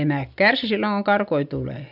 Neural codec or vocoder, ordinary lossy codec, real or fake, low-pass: none; none; real; 5.4 kHz